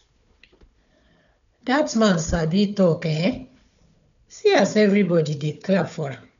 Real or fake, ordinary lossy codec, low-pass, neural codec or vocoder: fake; none; 7.2 kHz; codec, 16 kHz, 4 kbps, FunCodec, trained on Chinese and English, 50 frames a second